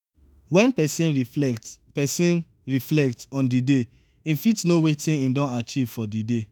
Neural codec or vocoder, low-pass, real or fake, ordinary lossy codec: autoencoder, 48 kHz, 32 numbers a frame, DAC-VAE, trained on Japanese speech; none; fake; none